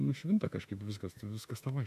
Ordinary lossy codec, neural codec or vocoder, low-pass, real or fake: AAC, 96 kbps; autoencoder, 48 kHz, 32 numbers a frame, DAC-VAE, trained on Japanese speech; 14.4 kHz; fake